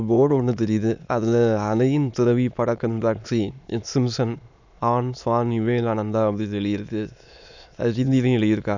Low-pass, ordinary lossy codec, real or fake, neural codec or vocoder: 7.2 kHz; none; fake; autoencoder, 22.05 kHz, a latent of 192 numbers a frame, VITS, trained on many speakers